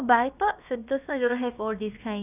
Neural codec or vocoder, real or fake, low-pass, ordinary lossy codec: codec, 16 kHz, about 1 kbps, DyCAST, with the encoder's durations; fake; 3.6 kHz; none